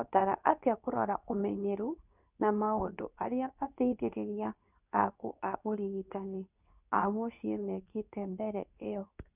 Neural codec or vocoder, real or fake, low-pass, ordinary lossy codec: codec, 24 kHz, 0.9 kbps, WavTokenizer, medium speech release version 2; fake; 3.6 kHz; none